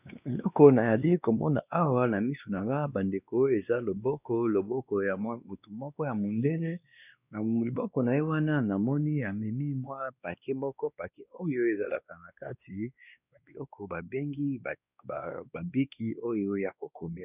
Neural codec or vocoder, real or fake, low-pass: codec, 16 kHz, 2 kbps, X-Codec, WavLM features, trained on Multilingual LibriSpeech; fake; 3.6 kHz